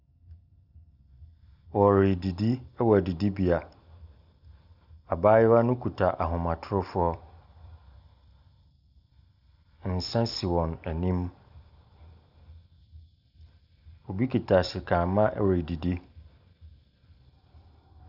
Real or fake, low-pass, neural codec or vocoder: real; 5.4 kHz; none